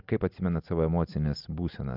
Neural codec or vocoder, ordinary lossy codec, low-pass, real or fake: none; Opus, 24 kbps; 5.4 kHz; real